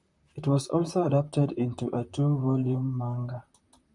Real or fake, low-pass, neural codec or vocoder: fake; 10.8 kHz; vocoder, 44.1 kHz, 128 mel bands, Pupu-Vocoder